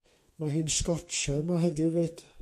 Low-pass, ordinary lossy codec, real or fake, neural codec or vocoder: 14.4 kHz; MP3, 64 kbps; fake; codec, 32 kHz, 1.9 kbps, SNAC